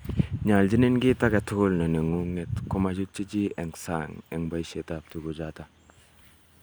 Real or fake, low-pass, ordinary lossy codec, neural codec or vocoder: real; none; none; none